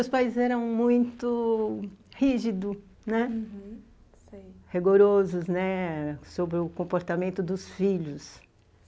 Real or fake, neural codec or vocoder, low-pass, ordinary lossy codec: real; none; none; none